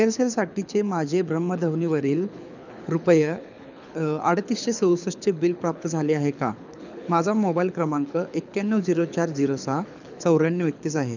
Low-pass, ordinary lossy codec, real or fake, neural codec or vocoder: 7.2 kHz; none; fake; codec, 24 kHz, 6 kbps, HILCodec